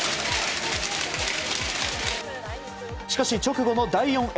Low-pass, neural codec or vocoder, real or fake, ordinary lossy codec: none; none; real; none